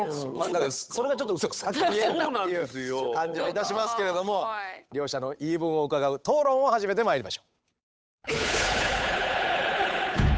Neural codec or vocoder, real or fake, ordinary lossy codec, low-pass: codec, 16 kHz, 8 kbps, FunCodec, trained on Chinese and English, 25 frames a second; fake; none; none